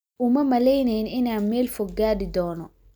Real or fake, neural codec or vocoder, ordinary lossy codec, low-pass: real; none; none; none